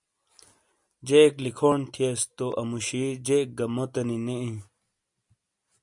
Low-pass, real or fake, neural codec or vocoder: 10.8 kHz; real; none